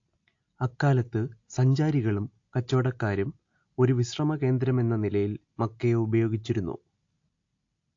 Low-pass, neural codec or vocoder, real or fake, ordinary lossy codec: 7.2 kHz; none; real; AAC, 48 kbps